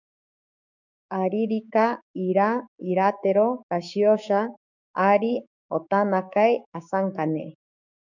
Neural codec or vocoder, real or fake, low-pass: codec, 16 kHz, 6 kbps, DAC; fake; 7.2 kHz